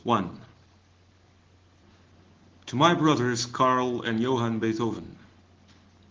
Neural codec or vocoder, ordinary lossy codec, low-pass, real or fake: none; Opus, 16 kbps; 7.2 kHz; real